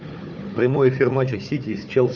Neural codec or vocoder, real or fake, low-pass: codec, 16 kHz, 4 kbps, FunCodec, trained on Chinese and English, 50 frames a second; fake; 7.2 kHz